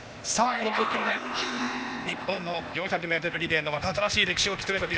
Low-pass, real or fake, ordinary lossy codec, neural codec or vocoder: none; fake; none; codec, 16 kHz, 0.8 kbps, ZipCodec